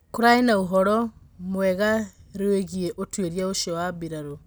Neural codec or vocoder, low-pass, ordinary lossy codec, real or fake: none; none; none; real